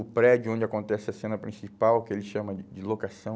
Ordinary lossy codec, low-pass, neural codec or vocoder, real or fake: none; none; none; real